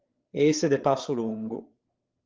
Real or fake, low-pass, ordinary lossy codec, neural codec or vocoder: fake; 7.2 kHz; Opus, 16 kbps; codec, 16 kHz, 8 kbps, FreqCodec, larger model